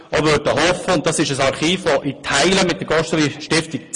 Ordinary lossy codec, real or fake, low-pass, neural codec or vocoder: MP3, 48 kbps; real; 10.8 kHz; none